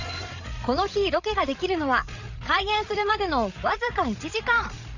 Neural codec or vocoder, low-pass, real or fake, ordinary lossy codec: codec, 16 kHz, 8 kbps, FreqCodec, larger model; 7.2 kHz; fake; Opus, 64 kbps